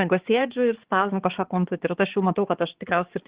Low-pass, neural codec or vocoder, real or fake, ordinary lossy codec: 3.6 kHz; codec, 16 kHz, 4 kbps, X-Codec, HuBERT features, trained on balanced general audio; fake; Opus, 16 kbps